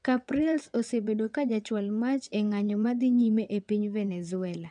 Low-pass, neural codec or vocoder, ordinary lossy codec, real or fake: 9.9 kHz; vocoder, 22.05 kHz, 80 mel bands, WaveNeXt; none; fake